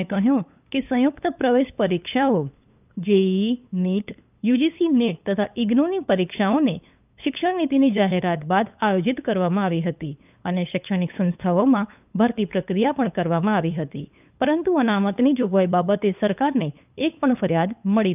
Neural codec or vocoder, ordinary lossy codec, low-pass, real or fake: codec, 16 kHz, 8 kbps, FunCodec, trained on LibriTTS, 25 frames a second; none; 3.6 kHz; fake